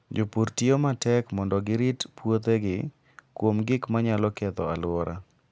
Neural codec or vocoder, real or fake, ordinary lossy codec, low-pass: none; real; none; none